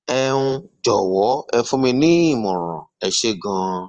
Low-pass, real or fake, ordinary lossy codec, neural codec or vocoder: 9.9 kHz; fake; Opus, 24 kbps; vocoder, 44.1 kHz, 128 mel bands every 512 samples, BigVGAN v2